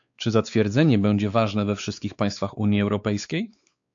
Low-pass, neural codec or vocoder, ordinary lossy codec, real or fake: 7.2 kHz; codec, 16 kHz, 4 kbps, X-Codec, WavLM features, trained on Multilingual LibriSpeech; AAC, 64 kbps; fake